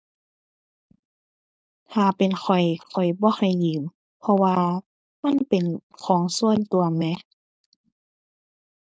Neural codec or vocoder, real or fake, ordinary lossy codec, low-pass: codec, 16 kHz, 4.8 kbps, FACodec; fake; none; none